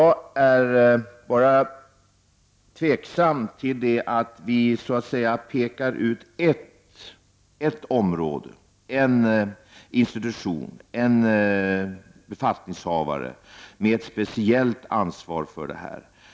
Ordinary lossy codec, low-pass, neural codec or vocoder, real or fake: none; none; none; real